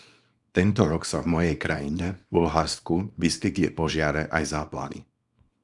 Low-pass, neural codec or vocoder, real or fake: 10.8 kHz; codec, 24 kHz, 0.9 kbps, WavTokenizer, small release; fake